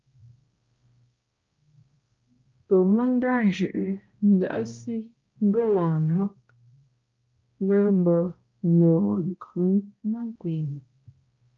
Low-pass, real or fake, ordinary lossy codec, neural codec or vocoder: 7.2 kHz; fake; Opus, 24 kbps; codec, 16 kHz, 0.5 kbps, X-Codec, HuBERT features, trained on balanced general audio